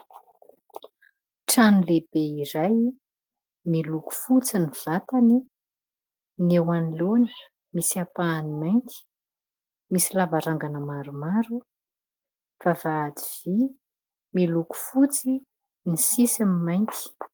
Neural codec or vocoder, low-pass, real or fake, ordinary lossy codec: none; 19.8 kHz; real; Opus, 24 kbps